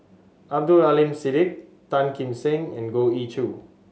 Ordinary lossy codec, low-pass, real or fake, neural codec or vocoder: none; none; real; none